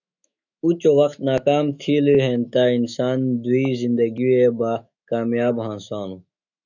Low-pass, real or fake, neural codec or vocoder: 7.2 kHz; fake; autoencoder, 48 kHz, 128 numbers a frame, DAC-VAE, trained on Japanese speech